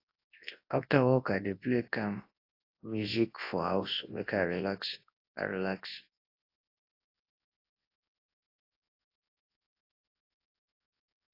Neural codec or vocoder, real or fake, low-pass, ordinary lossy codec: codec, 24 kHz, 0.9 kbps, WavTokenizer, large speech release; fake; 5.4 kHz; AAC, 32 kbps